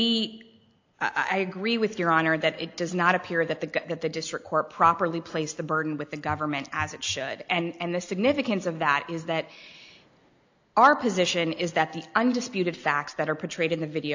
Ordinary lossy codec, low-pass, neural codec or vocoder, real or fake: AAC, 48 kbps; 7.2 kHz; none; real